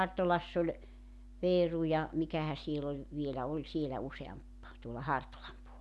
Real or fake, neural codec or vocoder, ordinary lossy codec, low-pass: real; none; none; none